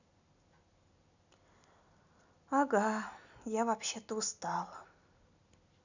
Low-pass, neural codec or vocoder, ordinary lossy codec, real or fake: 7.2 kHz; none; none; real